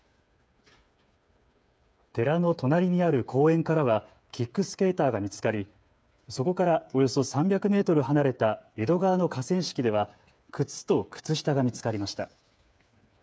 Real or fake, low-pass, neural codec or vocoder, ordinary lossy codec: fake; none; codec, 16 kHz, 8 kbps, FreqCodec, smaller model; none